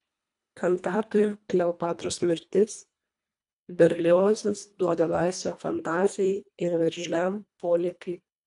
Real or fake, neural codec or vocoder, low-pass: fake; codec, 24 kHz, 1.5 kbps, HILCodec; 10.8 kHz